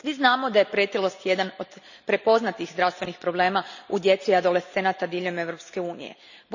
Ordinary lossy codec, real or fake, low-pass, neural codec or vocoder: none; real; 7.2 kHz; none